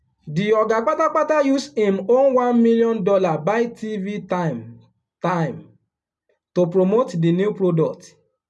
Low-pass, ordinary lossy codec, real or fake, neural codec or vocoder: none; none; real; none